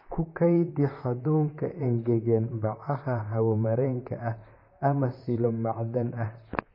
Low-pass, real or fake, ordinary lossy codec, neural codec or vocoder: 5.4 kHz; fake; MP3, 24 kbps; vocoder, 44.1 kHz, 128 mel bands, Pupu-Vocoder